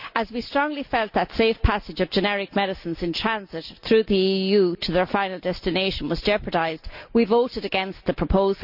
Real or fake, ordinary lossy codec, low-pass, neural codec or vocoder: real; none; 5.4 kHz; none